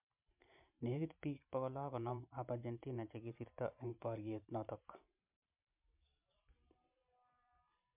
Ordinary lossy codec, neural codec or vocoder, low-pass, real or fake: MP3, 32 kbps; vocoder, 44.1 kHz, 128 mel bands every 512 samples, BigVGAN v2; 3.6 kHz; fake